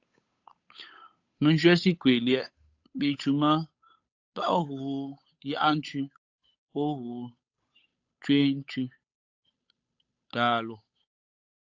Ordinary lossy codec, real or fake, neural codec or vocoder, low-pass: AAC, 48 kbps; fake; codec, 16 kHz, 8 kbps, FunCodec, trained on Chinese and English, 25 frames a second; 7.2 kHz